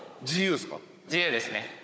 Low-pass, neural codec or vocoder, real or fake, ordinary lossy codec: none; codec, 16 kHz, 16 kbps, FunCodec, trained on Chinese and English, 50 frames a second; fake; none